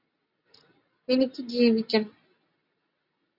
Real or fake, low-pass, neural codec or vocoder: real; 5.4 kHz; none